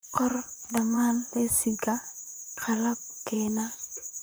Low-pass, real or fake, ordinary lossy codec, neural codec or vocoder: none; fake; none; vocoder, 44.1 kHz, 128 mel bands, Pupu-Vocoder